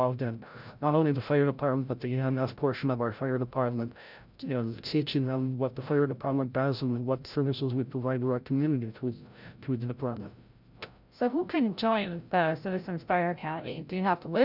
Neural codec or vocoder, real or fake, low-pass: codec, 16 kHz, 0.5 kbps, FreqCodec, larger model; fake; 5.4 kHz